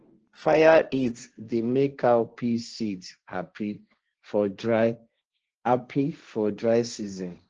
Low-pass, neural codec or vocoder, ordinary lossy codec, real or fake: 7.2 kHz; codec, 16 kHz, 1.1 kbps, Voila-Tokenizer; Opus, 16 kbps; fake